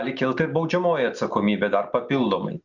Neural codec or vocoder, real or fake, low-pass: none; real; 7.2 kHz